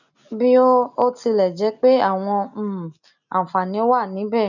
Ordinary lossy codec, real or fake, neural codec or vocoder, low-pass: none; real; none; 7.2 kHz